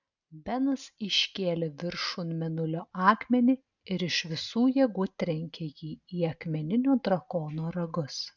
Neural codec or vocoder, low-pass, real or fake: none; 7.2 kHz; real